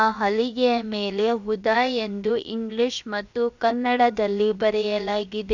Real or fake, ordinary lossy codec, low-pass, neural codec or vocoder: fake; none; 7.2 kHz; codec, 16 kHz, 0.7 kbps, FocalCodec